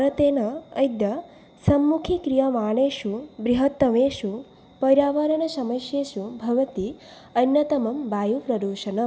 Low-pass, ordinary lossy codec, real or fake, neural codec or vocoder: none; none; real; none